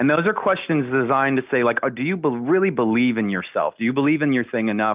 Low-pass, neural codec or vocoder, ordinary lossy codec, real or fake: 3.6 kHz; none; Opus, 24 kbps; real